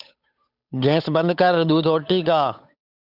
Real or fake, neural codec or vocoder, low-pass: fake; codec, 16 kHz, 8 kbps, FunCodec, trained on Chinese and English, 25 frames a second; 5.4 kHz